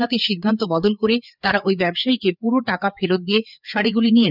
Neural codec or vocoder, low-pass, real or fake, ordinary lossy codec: codec, 16 kHz, 4 kbps, FreqCodec, larger model; 5.4 kHz; fake; none